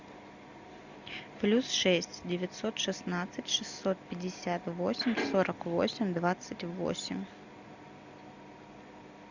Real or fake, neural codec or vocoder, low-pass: real; none; 7.2 kHz